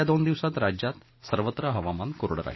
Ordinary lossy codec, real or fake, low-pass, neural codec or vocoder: MP3, 24 kbps; real; 7.2 kHz; none